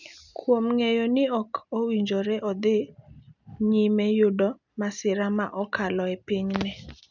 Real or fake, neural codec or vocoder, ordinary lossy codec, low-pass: real; none; none; 7.2 kHz